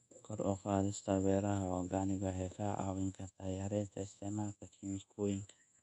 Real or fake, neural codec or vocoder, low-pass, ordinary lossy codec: fake; codec, 24 kHz, 1.2 kbps, DualCodec; 10.8 kHz; none